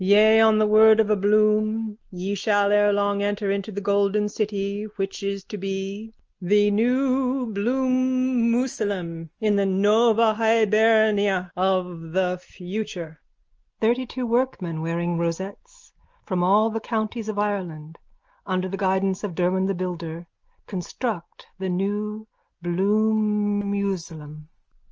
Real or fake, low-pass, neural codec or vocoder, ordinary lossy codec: real; 7.2 kHz; none; Opus, 24 kbps